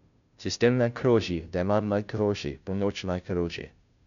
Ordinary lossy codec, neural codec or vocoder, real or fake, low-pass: none; codec, 16 kHz, 0.5 kbps, FunCodec, trained on Chinese and English, 25 frames a second; fake; 7.2 kHz